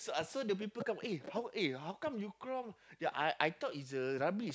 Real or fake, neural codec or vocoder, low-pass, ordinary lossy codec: real; none; none; none